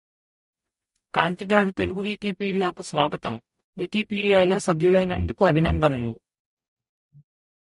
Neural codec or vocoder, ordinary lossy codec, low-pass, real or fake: codec, 44.1 kHz, 0.9 kbps, DAC; MP3, 48 kbps; 14.4 kHz; fake